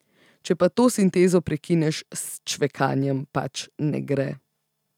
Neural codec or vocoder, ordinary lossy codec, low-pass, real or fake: none; none; 19.8 kHz; real